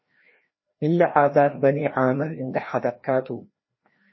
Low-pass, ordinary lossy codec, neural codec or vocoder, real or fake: 7.2 kHz; MP3, 24 kbps; codec, 16 kHz, 1 kbps, FreqCodec, larger model; fake